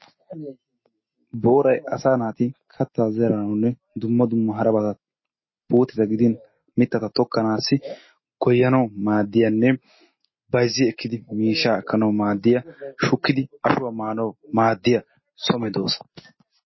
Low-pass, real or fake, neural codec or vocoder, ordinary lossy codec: 7.2 kHz; real; none; MP3, 24 kbps